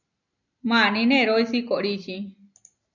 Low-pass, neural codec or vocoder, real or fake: 7.2 kHz; none; real